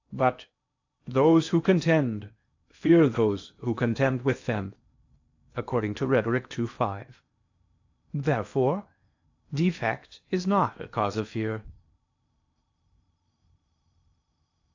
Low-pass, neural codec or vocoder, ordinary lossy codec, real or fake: 7.2 kHz; codec, 16 kHz in and 24 kHz out, 0.8 kbps, FocalCodec, streaming, 65536 codes; AAC, 48 kbps; fake